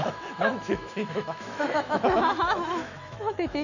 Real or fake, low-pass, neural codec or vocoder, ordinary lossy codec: fake; 7.2 kHz; autoencoder, 48 kHz, 128 numbers a frame, DAC-VAE, trained on Japanese speech; none